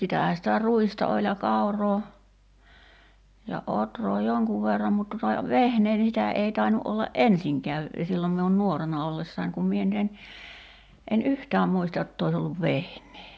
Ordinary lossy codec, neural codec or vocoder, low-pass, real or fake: none; none; none; real